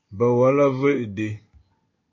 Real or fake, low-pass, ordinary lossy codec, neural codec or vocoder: fake; 7.2 kHz; MP3, 48 kbps; codec, 16 kHz in and 24 kHz out, 1 kbps, XY-Tokenizer